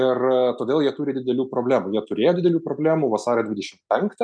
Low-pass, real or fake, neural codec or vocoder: 9.9 kHz; real; none